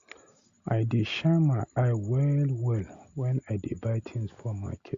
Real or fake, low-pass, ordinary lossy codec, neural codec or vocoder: real; 7.2 kHz; Opus, 64 kbps; none